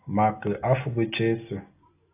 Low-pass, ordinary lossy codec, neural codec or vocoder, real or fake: 3.6 kHz; AAC, 24 kbps; none; real